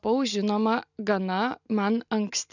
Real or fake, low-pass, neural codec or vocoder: real; 7.2 kHz; none